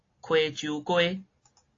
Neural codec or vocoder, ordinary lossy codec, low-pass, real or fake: none; AAC, 32 kbps; 7.2 kHz; real